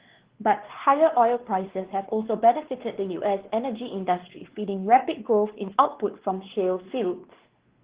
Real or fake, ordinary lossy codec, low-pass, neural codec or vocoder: fake; Opus, 16 kbps; 3.6 kHz; codec, 16 kHz, 2 kbps, X-Codec, WavLM features, trained on Multilingual LibriSpeech